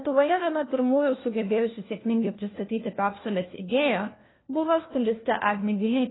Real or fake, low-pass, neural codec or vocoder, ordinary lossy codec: fake; 7.2 kHz; codec, 16 kHz, 1 kbps, FunCodec, trained on LibriTTS, 50 frames a second; AAC, 16 kbps